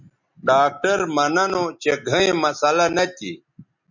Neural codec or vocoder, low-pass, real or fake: none; 7.2 kHz; real